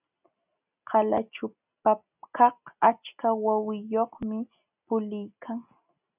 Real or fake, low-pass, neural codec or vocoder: real; 3.6 kHz; none